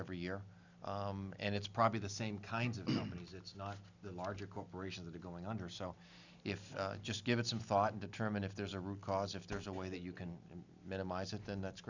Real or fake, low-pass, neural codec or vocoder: real; 7.2 kHz; none